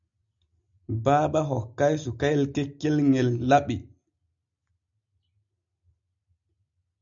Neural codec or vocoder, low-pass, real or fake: none; 7.2 kHz; real